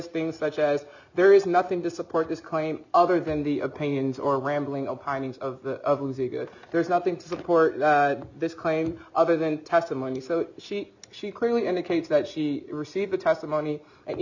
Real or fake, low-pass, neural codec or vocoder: real; 7.2 kHz; none